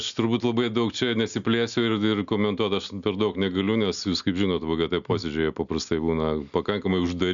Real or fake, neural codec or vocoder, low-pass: real; none; 7.2 kHz